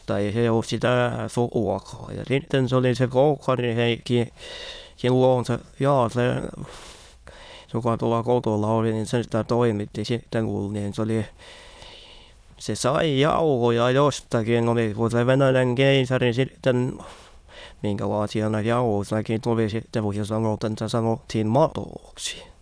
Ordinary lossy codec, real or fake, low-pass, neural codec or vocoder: none; fake; none; autoencoder, 22.05 kHz, a latent of 192 numbers a frame, VITS, trained on many speakers